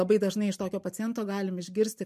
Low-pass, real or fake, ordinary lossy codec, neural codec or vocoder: 14.4 kHz; real; MP3, 64 kbps; none